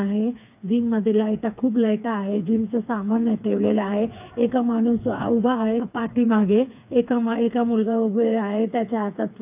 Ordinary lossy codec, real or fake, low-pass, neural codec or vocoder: AAC, 32 kbps; fake; 3.6 kHz; codec, 16 kHz, 4 kbps, FreqCodec, smaller model